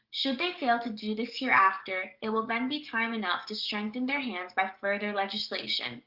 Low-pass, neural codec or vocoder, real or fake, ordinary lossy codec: 5.4 kHz; codec, 44.1 kHz, 7.8 kbps, DAC; fake; Opus, 24 kbps